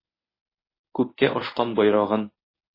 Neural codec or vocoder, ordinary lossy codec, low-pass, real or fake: codec, 24 kHz, 0.9 kbps, WavTokenizer, medium speech release version 1; MP3, 24 kbps; 5.4 kHz; fake